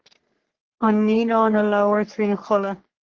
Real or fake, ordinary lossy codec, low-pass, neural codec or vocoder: fake; Opus, 16 kbps; 7.2 kHz; codec, 44.1 kHz, 3.4 kbps, Pupu-Codec